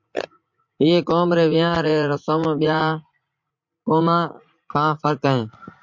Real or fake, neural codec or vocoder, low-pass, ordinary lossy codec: fake; vocoder, 44.1 kHz, 80 mel bands, Vocos; 7.2 kHz; MP3, 48 kbps